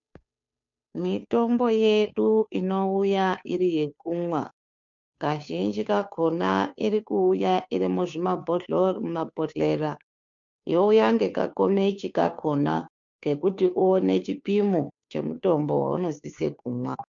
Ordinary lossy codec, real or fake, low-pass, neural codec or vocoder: MP3, 64 kbps; fake; 7.2 kHz; codec, 16 kHz, 2 kbps, FunCodec, trained on Chinese and English, 25 frames a second